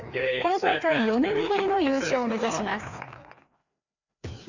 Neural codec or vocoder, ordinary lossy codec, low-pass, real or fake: codec, 16 kHz, 2 kbps, FreqCodec, larger model; none; 7.2 kHz; fake